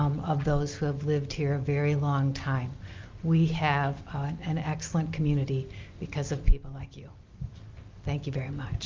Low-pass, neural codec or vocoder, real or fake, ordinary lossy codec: 7.2 kHz; none; real; Opus, 24 kbps